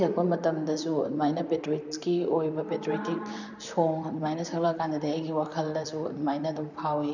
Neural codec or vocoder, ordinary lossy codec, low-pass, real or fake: vocoder, 44.1 kHz, 128 mel bands, Pupu-Vocoder; none; 7.2 kHz; fake